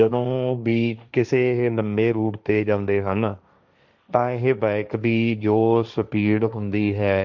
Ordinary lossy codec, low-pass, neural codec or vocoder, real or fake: none; 7.2 kHz; codec, 16 kHz, 1.1 kbps, Voila-Tokenizer; fake